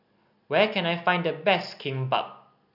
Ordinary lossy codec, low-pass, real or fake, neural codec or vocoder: none; 5.4 kHz; real; none